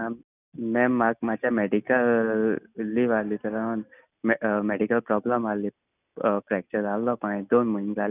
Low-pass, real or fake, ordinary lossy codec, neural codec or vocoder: 3.6 kHz; real; none; none